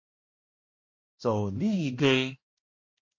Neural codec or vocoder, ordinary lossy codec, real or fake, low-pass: codec, 16 kHz, 1 kbps, X-Codec, HuBERT features, trained on balanced general audio; MP3, 32 kbps; fake; 7.2 kHz